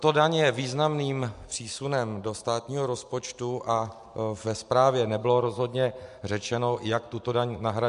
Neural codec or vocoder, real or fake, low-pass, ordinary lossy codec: none; real; 10.8 kHz; MP3, 64 kbps